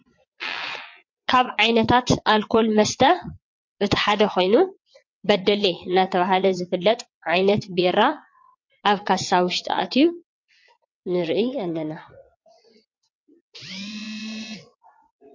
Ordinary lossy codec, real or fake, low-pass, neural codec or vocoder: MP3, 48 kbps; fake; 7.2 kHz; vocoder, 22.05 kHz, 80 mel bands, WaveNeXt